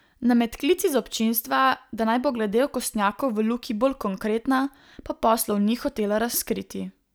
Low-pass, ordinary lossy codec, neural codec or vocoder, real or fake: none; none; none; real